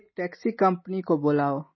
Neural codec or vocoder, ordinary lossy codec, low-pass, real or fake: none; MP3, 24 kbps; 7.2 kHz; real